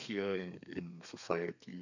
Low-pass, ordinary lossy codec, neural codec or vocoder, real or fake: 7.2 kHz; none; codec, 32 kHz, 1.9 kbps, SNAC; fake